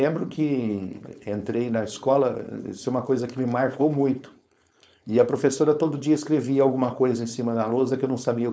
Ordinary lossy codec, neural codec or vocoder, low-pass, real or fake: none; codec, 16 kHz, 4.8 kbps, FACodec; none; fake